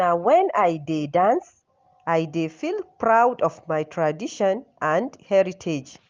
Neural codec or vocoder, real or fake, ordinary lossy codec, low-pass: none; real; Opus, 32 kbps; 7.2 kHz